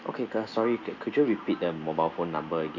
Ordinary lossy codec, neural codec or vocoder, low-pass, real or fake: none; none; 7.2 kHz; real